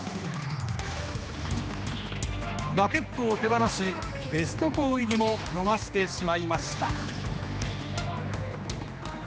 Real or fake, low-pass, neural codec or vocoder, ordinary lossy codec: fake; none; codec, 16 kHz, 2 kbps, X-Codec, HuBERT features, trained on general audio; none